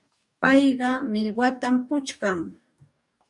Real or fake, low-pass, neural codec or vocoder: fake; 10.8 kHz; codec, 44.1 kHz, 2.6 kbps, DAC